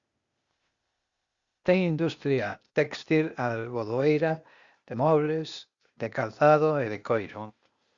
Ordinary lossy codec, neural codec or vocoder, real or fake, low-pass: Opus, 64 kbps; codec, 16 kHz, 0.8 kbps, ZipCodec; fake; 7.2 kHz